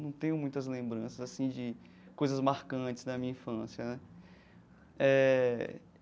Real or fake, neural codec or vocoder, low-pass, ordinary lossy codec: real; none; none; none